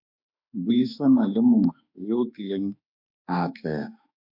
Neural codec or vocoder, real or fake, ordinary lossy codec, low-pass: autoencoder, 48 kHz, 32 numbers a frame, DAC-VAE, trained on Japanese speech; fake; MP3, 48 kbps; 5.4 kHz